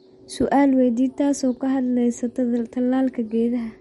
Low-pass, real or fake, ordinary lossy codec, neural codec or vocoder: 19.8 kHz; real; MP3, 48 kbps; none